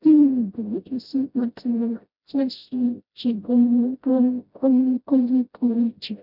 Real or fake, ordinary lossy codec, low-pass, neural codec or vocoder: fake; none; 5.4 kHz; codec, 16 kHz, 0.5 kbps, FreqCodec, smaller model